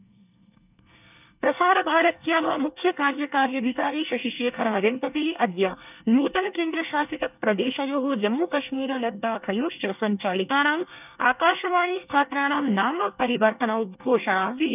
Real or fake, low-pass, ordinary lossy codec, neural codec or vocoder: fake; 3.6 kHz; none; codec, 24 kHz, 1 kbps, SNAC